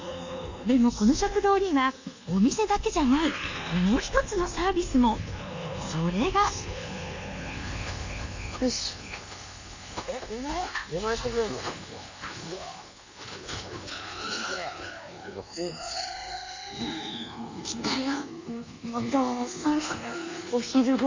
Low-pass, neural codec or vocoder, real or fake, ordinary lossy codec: 7.2 kHz; codec, 24 kHz, 1.2 kbps, DualCodec; fake; AAC, 48 kbps